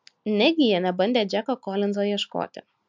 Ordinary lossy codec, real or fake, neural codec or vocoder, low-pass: MP3, 64 kbps; real; none; 7.2 kHz